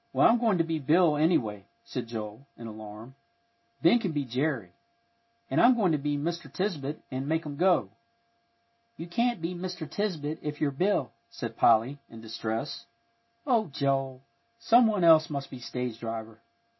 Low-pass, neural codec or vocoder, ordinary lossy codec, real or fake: 7.2 kHz; none; MP3, 24 kbps; real